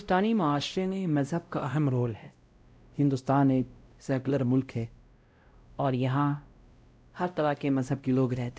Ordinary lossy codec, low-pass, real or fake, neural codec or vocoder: none; none; fake; codec, 16 kHz, 0.5 kbps, X-Codec, WavLM features, trained on Multilingual LibriSpeech